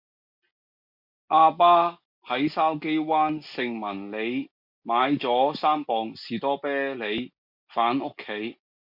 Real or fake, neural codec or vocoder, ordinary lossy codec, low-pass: real; none; MP3, 48 kbps; 5.4 kHz